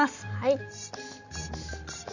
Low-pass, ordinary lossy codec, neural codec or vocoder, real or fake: 7.2 kHz; none; none; real